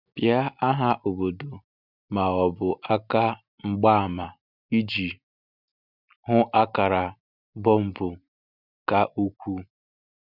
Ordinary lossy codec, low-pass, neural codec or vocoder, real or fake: none; 5.4 kHz; none; real